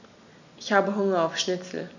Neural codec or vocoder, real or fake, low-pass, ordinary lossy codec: none; real; 7.2 kHz; none